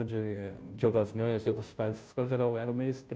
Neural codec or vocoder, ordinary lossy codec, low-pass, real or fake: codec, 16 kHz, 0.5 kbps, FunCodec, trained on Chinese and English, 25 frames a second; none; none; fake